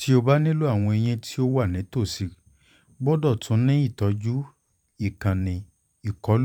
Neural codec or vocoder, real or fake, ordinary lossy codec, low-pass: vocoder, 44.1 kHz, 128 mel bands every 512 samples, BigVGAN v2; fake; none; 19.8 kHz